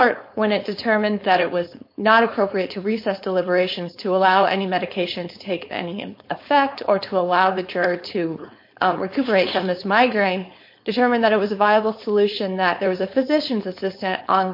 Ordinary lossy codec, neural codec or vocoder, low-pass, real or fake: MP3, 32 kbps; codec, 16 kHz, 4.8 kbps, FACodec; 5.4 kHz; fake